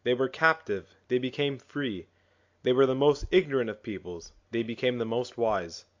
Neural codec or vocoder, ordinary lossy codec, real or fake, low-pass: none; AAC, 48 kbps; real; 7.2 kHz